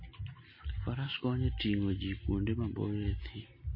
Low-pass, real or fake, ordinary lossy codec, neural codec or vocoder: 5.4 kHz; real; MP3, 24 kbps; none